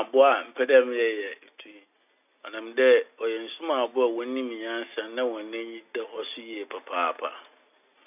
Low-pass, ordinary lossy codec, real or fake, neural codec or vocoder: 3.6 kHz; none; real; none